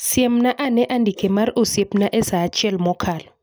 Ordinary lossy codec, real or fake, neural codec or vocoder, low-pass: none; real; none; none